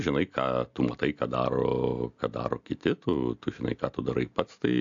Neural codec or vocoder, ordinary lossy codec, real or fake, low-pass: none; AAC, 64 kbps; real; 7.2 kHz